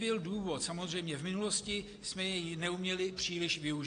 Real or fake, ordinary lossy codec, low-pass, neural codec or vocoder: real; AAC, 48 kbps; 9.9 kHz; none